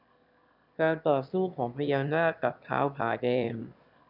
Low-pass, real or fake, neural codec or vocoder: 5.4 kHz; fake; autoencoder, 22.05 kHz, a latent of 192 numbers a frame, VITS, trained on one speaker